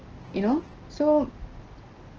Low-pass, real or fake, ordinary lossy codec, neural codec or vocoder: 7.2 kHz; real; Opus, 16 kbps; none